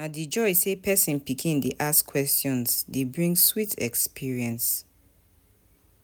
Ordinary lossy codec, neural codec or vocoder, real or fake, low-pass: none; none; real; none